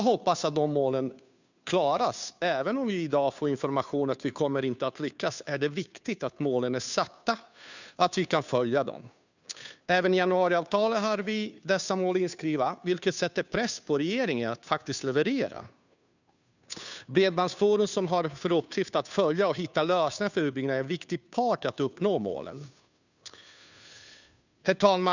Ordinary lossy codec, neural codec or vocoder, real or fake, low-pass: none; codec, 16 kHz, 2 kbps, FunCodec, trained on Chinese and English, 25 frames a second; fake; 7.2 kHz